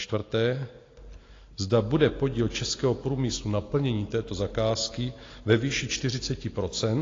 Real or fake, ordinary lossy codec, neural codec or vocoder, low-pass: real; AAC, 32 kbps; none; 7.2 kHz